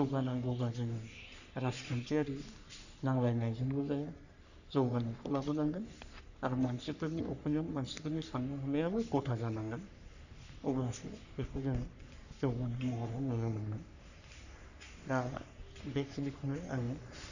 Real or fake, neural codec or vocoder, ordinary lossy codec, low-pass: fake; codec, 44.1 kHz, 3.4 kbps, Pupu-Codec; none; 7.2 kHz